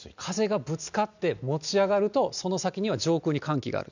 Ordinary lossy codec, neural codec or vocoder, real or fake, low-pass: none; none; real; 7.2 kHz